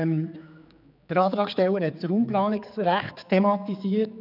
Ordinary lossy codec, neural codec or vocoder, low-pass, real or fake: none; codec, 16 kHz, 4 kbps, X-Codec, HuBERT features, trained on general audio; 5.4 kHz; fake